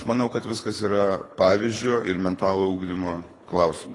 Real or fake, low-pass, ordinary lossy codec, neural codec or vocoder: fake; 10.8 kHz; AAC, 32 kbps; codec, 24 kHz, 3 kbps, HILCodec